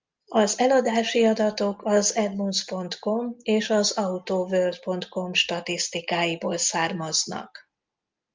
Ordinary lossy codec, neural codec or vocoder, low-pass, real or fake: Opus, 32 kbps; none; 7.2 kHz; real